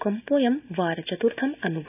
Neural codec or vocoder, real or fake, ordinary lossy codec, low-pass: none; real; none; 3.6 kHz